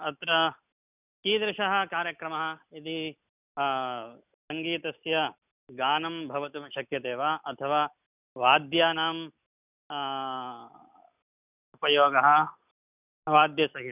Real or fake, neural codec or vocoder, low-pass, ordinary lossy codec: real; none; 3.6 kHz; none